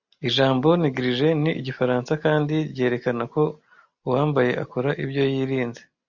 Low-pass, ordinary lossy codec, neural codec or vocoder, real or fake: 7.2 kHz; Opus, 64 kbps; none; real